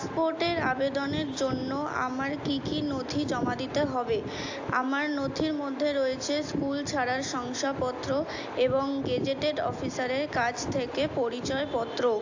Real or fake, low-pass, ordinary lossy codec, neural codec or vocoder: real; 7.2 kHz; AAC, 48 kbps; none